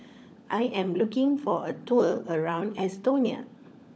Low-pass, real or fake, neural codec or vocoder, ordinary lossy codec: none; fake; codec, 16 kHz, 16 kbps, FunCodec, trained on LibriTTS, 50 frames a second; none